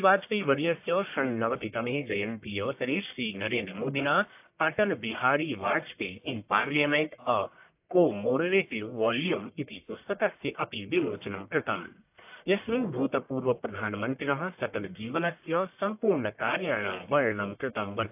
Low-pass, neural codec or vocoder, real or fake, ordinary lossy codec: 3.6 kHz; codec, 44.1 kHz, 1.7 kbps, Pupu-Codec; fake; AAC, 32 kbps